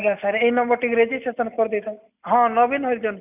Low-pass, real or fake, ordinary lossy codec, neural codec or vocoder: 3.6 kHz; real; none; none